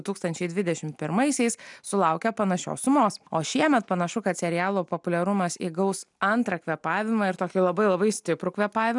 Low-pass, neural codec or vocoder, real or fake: 10.8 kHz; none; real